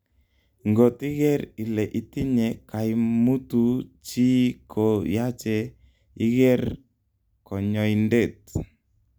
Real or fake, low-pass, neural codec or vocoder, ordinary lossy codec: real; none; none; none